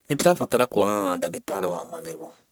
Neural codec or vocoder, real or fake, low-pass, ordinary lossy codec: codec, 44.1 kHz, 1.7 kbps, Pupu-Codec; fake; none; none